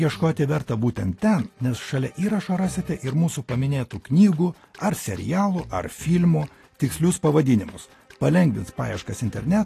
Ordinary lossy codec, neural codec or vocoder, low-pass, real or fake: AAC, 48 kbps; none; 14.4 kHz; real